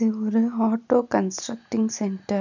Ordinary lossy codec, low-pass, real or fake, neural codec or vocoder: none; 7.2 kHz; real; none